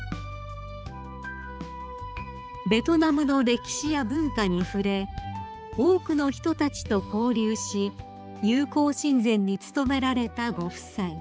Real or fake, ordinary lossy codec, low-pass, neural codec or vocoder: fake; none; none; codec, 16 kHz, 4 kbps, X-Codec, HuBERT features, trained on balanced general audio